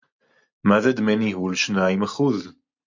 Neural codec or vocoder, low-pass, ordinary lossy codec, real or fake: none; 7.2 kHz; MP3, 48 kbps; real